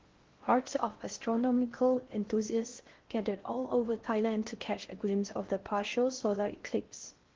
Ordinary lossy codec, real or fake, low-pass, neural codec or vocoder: Opus, 16 kbps; fake; 7.2 kHz; codec, 16 kHz in and 24 kHz out, 0.6 kbps, FocalCodec, streaming, 4096 codes